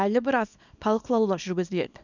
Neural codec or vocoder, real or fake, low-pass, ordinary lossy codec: codec, 24 kHz, 0.9 kbps, WavTokenizer, small release; fake; 7.2 kHz; none